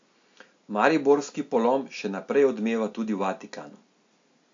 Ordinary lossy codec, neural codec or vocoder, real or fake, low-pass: AAC, 48 kbps; none; real; 7.2 kHz